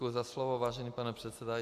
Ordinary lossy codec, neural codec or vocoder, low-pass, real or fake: AAC, 96 kbps; none; 14.4 kHz; real